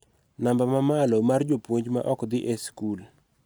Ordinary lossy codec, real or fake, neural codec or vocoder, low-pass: none; real; none; none